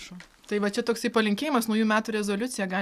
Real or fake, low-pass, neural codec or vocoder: real; 14.4 kHz; none